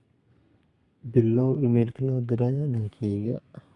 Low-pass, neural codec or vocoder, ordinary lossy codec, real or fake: 10.8 kHz; codec, 32 kHz, 1.9 kbps, SNAC; Opus, 64 kbps; fake